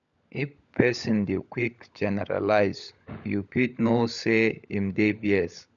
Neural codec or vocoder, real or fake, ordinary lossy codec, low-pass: codec, 16 kHz, 16 kbps, FunCodec, trained on LibriTTS, 50 frames a second; fake; none; 7.2 kHz